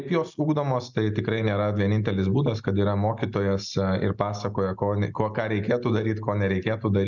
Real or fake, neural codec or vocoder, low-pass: real; none; 7.2 kHz